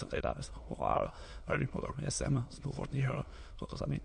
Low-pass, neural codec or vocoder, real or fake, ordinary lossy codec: 9.9 kHz; autoencoder, 22.05 kHz, a latent of 192 numbers a frame, VITS, trained on many speakers; fake; MP3, 48 kbps